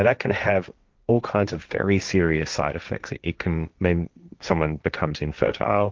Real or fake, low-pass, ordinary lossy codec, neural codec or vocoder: fake; 7.2 kHz; Opus, 24 kbps; codec, 16 kHz, 1.1 kbps, Voila-Tokenizer